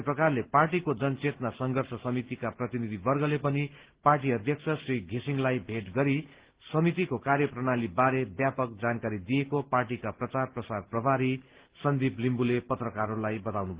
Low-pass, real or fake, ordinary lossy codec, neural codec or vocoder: 3.6 kHz; real; Opus, 16 kbps; none